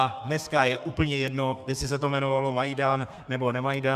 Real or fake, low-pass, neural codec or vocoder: fake; 14.4 kHz; codec, 32 kHz, 1.9 kbps, SNAC